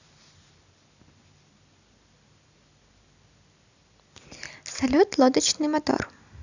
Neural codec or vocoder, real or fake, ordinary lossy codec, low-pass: none; real; none; 7.2 kHz